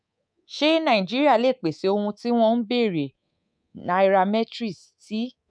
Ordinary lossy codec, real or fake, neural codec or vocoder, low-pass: none; fake; codec, 24 kHz, 3.1 kbps, DualCodec; 9.9 kHz